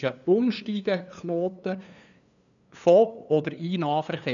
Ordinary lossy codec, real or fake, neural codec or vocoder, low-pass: none; fake; codec, 16 kHz, 2 kbps, FunCodec, trained on LibriTTS, 25 frames a second; 7.2 kHz